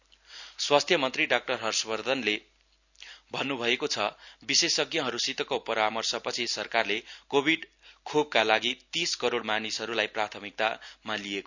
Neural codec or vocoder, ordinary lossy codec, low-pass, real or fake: none; none; 7.2 kHz; real